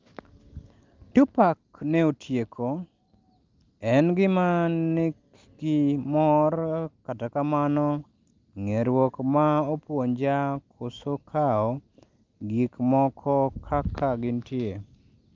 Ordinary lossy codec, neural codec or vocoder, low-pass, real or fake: Opus, 32 kbps; none; 7.2 kHz; real